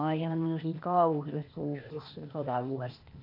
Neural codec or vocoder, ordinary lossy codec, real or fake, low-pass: codec, 16 kHz, 0.8 kbps, ZipCodec; none; fake; 5.4 kHz